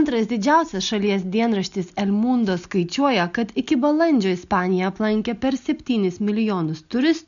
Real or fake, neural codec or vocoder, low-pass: real; none; 7.2 kHz